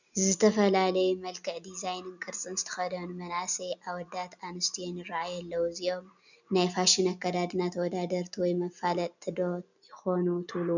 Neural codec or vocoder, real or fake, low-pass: none; real; 7.2 kHz